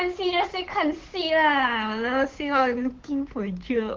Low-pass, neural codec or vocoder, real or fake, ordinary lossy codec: 7.2 kHz; codec, 16 kHz, 8 kbps, FunCodec, trained on Chinese and English, 25 frames a second; fake; Opus, 16 kbps